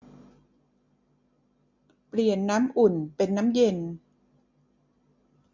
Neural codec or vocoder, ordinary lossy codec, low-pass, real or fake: none; MP3, 64 kbps; 7.2 kHz; real